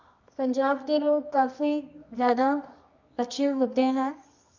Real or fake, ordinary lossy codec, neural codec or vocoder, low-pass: fake; none; codec, 24 kHz, 0.9 kbps, WavTokenizer, medium music audio release; 7.2 kHz